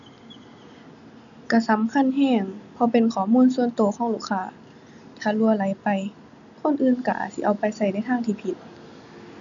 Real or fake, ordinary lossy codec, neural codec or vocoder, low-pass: real; none; none; 7.2 kHz